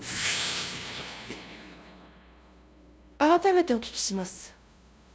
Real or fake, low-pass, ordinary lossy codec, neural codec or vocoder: fake; none; none; codec, 16 kHz, 0.5 kbps, FunCodec, trained on LibriTTS, 25 frames a second